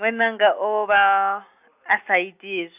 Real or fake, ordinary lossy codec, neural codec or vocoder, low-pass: real; AAC, 32 kbps; none; 3.6 kHz